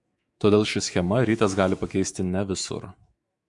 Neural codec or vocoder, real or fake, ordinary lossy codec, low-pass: autoencoder, 48 kHz, 128 numbers a frame, DAC-VAE, trained on Japanese speech; fake; Opus, 64 kbps; 10.8 kHz